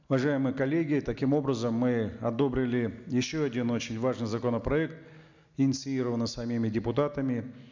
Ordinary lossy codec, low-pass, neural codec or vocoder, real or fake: MP3, 64 kbps; 7.2 kHz; none; real